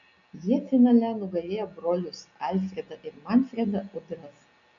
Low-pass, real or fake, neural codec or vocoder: 7.2 kHz; real; none